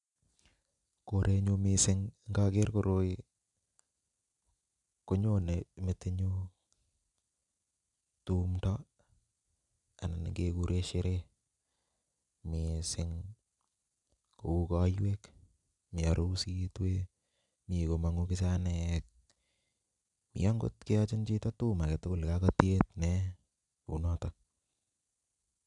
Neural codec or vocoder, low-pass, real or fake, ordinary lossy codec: none; 10.8 kHz; real; none